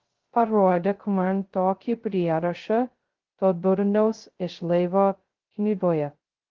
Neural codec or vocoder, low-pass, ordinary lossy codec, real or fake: codec, 16 kHz, 0.2 kbps, FocalCodec; 7.2 kHz; Opus, 16 kbps; fake